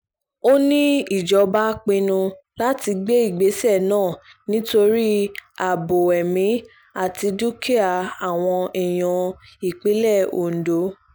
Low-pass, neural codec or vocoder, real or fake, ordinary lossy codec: none; none; real; none